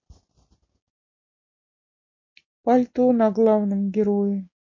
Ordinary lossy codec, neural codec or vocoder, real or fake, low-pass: MP3, 32 kbps; none; real; 7.2 kHz